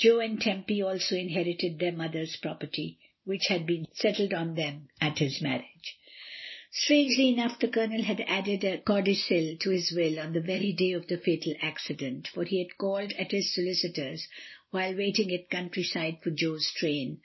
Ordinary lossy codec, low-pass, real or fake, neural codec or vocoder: MP3, 24 kbps; 7.2 kHz; real; none